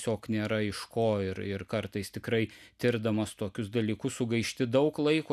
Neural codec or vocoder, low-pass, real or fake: none; 14.4 kHz; real